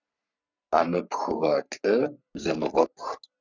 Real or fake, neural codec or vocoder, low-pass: fake; codec, 44.1 kHz, 3.4 kbps, Pupu-Codec; 7.2 kHz